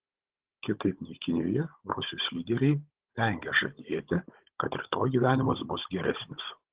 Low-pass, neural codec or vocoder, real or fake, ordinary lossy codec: 3.6 kHz; codec, 16 kHz, 16 kbps, FunCodec, trained on Chinese and English, 50 frames a second; fake; Opus, 16 kbps